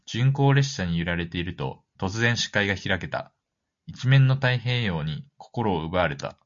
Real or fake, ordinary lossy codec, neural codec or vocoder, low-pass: real; MP3, 48 kbps; none; 7.2 kHz